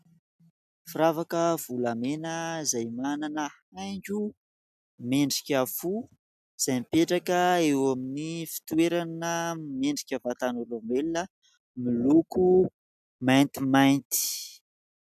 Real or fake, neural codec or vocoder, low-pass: real; none; 14.4 kHz